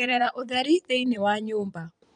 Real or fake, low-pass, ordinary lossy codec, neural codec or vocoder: fake; 9.9 kHz; none; vocoder, 22.05 kHz, 80 mel bands, Vocos